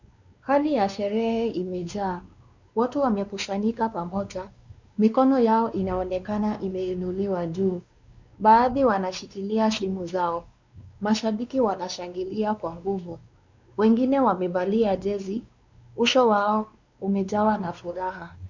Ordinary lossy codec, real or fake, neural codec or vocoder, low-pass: Opus, 64 kbps; fake; codec, 16 kHz, 2 kbps, X-Codec, WavLM features, trained on Multilingual LibriSpeech; 7.2 kHz